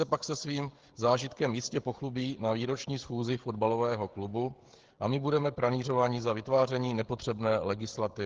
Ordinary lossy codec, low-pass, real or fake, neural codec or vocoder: Opus, 16 kbps; 7.2 kHz; fake; codec, 16 kHz, 16 kbps, FreqCodec, smaller model